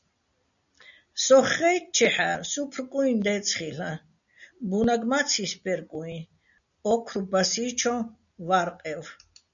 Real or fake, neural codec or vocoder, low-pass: real; none; 7.2 kHz